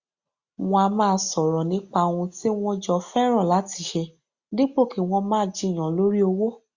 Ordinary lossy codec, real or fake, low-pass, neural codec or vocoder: Opus, 64 kbps; real; 7.2 kHz; none